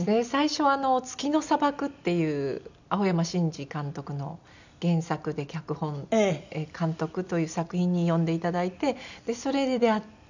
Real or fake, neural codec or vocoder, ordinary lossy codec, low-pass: real; none; none; 7.2 kHz